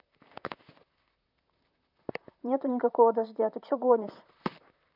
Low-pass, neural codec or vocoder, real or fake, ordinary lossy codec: 5.4 kHz; vocoder, 44.1 kHz, 128 mel bands, Pupu-Vocoder; fake; none